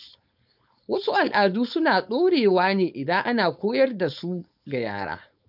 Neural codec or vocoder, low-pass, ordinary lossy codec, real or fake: codec, 16 kHz, 4.8 kbps, FACodec; 5.4 kHz; none; fake